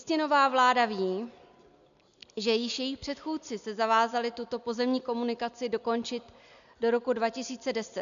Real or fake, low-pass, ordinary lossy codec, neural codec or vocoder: real; 7.2 kHz; MP3, 96 kbps; none